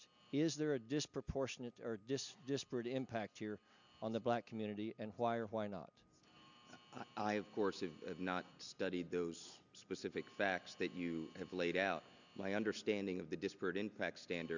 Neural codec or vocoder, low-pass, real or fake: none; 7.2 kHz; real